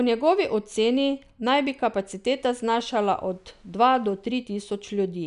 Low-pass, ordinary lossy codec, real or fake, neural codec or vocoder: 10.8 kHz; none; real; none